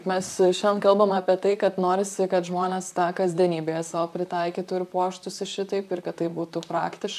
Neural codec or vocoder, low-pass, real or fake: vocoder, 44.1 kHz, 128 mel bands, Pupu-Vocoder; 14.4 kHz; fake